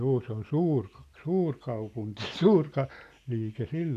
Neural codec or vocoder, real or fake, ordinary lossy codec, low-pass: none; real; none; 14.4 kHz